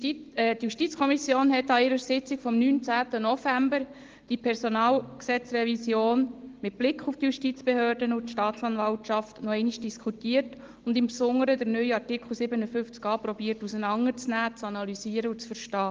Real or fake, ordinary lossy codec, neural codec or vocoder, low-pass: real; Opus, 16 kbps; none; 7.2 kHz